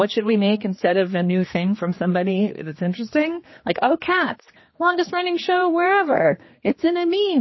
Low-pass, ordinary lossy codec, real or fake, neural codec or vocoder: 7.2 kHz; MP3, 24 kbps; fake; codec, 16 kHz, 2 kbps, X-Codec, HuBERT features, trained on general audio